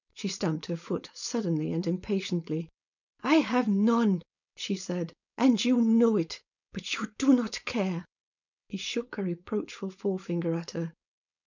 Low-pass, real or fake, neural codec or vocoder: 7.2 kHz; fake; codec, 16 kHz, 4.8 kbps, FACodec